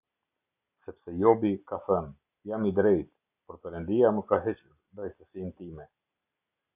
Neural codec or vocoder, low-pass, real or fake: none; 3.6 kHz; real